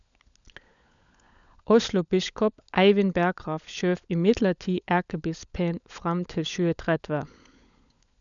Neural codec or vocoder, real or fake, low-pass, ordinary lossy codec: codec, 16 kHz, 16 kbps, FunCodec, trained on LibriTTS, 50 frames a second; fake; 7.2 kHz; MP3, 96 kbps